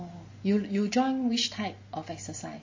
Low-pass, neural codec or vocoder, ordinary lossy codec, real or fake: 7.2 kHz; none; MP3, 32 kbps; real